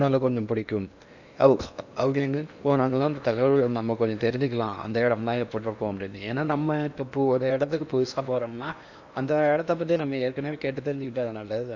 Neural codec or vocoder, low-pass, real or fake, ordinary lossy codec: codec, 16 kHz in and 24 kHz out, 0.8 kbps, FocalCodec, streaming, 65536 codes; 7.2 kHz; fake; none